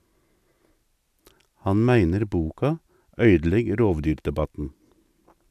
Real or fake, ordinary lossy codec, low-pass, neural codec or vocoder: real; none; 14.4 kHz; none